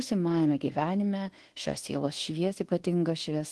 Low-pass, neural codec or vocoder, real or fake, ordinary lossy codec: 10.8 kHz; codec, 24 kHz, 1.2 kbps, DualCodec; fake; Opus, 16 kbps